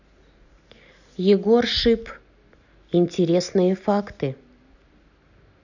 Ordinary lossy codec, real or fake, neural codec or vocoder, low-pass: none; real; none; 7.2 kHz